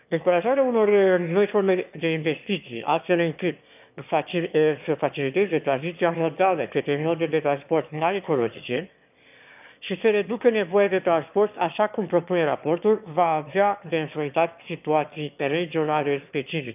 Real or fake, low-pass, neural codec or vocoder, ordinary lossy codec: fake; 3.6 kHz; autoencoder, 22.05 kHz, a latent of 192 numbers a frame, VITS, trained on one speaker; none